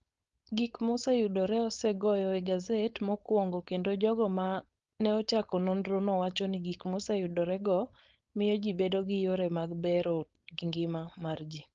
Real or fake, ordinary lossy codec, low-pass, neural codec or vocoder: fake; Opus, 32 kbps; 7.2 kHz; codec, 16 kHz, 4.8 kbps, FACodec